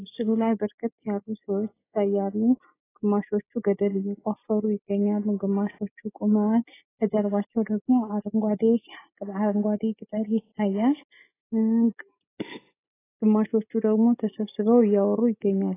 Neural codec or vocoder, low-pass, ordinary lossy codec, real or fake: none; 3.6 kHz; AAC, 16 kbps; real